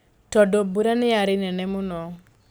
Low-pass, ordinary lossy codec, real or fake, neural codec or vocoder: none; none; real; none